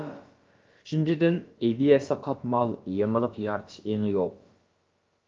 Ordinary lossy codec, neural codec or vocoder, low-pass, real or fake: Opus, 32 kbps; codec, 16 kHz, about 1 kbps, DyCAST, with the encoder's durations; 7.2 kHz; fake